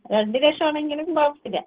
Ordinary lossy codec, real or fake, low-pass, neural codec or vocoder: Opus, 16 kbps; real; 3.6 kHz; none